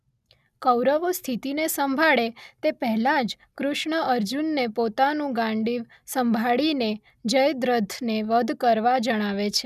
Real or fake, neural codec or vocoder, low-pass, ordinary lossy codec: real; none; 14.4 kHz; none